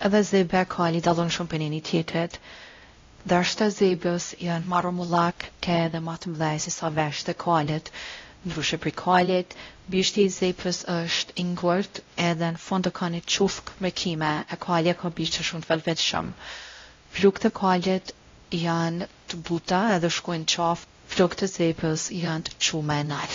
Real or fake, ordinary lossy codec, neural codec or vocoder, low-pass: fake; AAC, 32 kbps; codec, 16 kHz, 0.5 kbps, X-Codec, WavLM features, trained on Multilingual LibriSpeech; 7.2 kHz